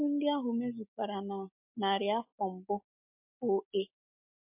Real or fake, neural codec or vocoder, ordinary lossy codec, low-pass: real; none; MP3, 32 kbps; 3.6 kHz